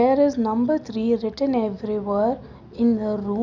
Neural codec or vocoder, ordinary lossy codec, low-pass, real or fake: none; none; 7.2 kHz; real